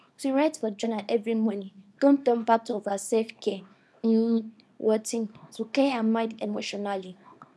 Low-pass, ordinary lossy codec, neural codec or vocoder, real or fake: none; none; codec, 24 kHz, 0.9 kbps, WavTokenizer, small release; fake